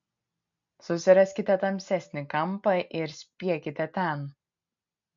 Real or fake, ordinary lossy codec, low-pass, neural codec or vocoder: real; MP3, 48 kbps; 7.2 kHz; none